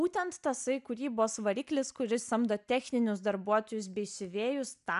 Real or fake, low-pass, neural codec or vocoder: real; 10.8 kHz; none